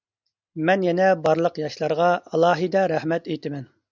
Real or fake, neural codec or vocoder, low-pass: real; none; 7.2 kHz